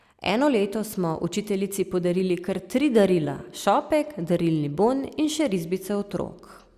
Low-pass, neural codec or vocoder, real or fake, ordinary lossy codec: 14.4 kHz; none; real; Opus, 64 kbps